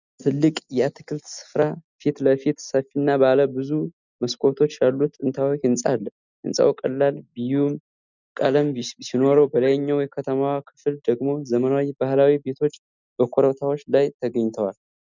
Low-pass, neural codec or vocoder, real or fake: 7.2 kHz; none; real